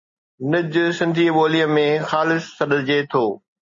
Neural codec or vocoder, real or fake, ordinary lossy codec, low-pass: none; real; MP3, 32 kbps; 7.2 kHz